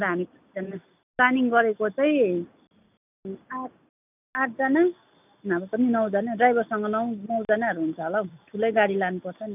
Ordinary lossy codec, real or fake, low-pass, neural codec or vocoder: none; real; 3.6 kHz; none